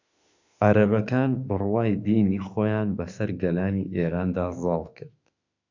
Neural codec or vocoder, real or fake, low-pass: autoencoder, 48 kHz, 32 numbers a frame, DAC-VAE, trained on Japanese speech; fake; 7.2 kHz